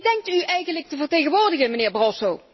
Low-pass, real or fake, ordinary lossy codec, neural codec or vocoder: 7.2 kHz; real; MP3, 24 kbps; none